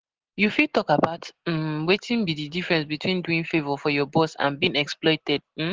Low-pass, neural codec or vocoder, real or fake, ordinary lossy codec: 7.2 kHz; none; real; Opus, 16 kbps